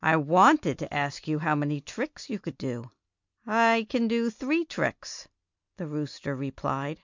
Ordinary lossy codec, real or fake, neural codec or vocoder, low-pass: MP3, 64 kbps; real; none; 7.2 kHz